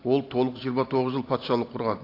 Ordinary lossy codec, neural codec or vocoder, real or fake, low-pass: AAC, 32 kbps; none; real; 5.4 kHz